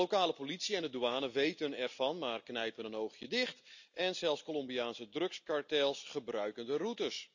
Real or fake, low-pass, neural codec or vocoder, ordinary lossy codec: real; 7.2 kHz; none; none